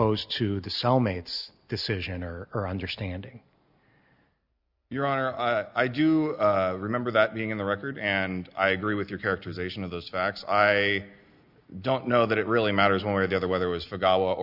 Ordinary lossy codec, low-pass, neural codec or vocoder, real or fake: Opus, 64 kbps; 5.4 kHz; none; real